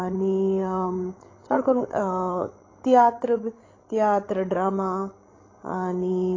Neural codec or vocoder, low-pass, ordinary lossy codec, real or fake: codec, 16 kHz, 16 kbps, FreqCodec, larger model; 7.2 kHz; AAC, 32 kbps; fake